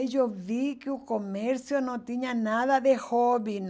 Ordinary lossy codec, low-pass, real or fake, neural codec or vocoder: none; none; real; none